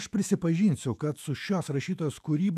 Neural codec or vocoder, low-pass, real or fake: autoencoder, 48 kHz, 128 numbers a frame, DAC-VAE, trained on Japanese speech; 14.4 kHz; fake